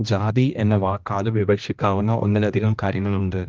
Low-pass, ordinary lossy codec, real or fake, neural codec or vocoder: 7.2 kHz; Opus, 24 kbps; fake; codec, 16 kHz, 1 kbps, X-Codec, HuBERT features, trained on general audio